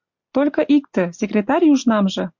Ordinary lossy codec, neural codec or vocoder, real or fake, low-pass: MP3, 64 kbps; none; real; 7.2 kHz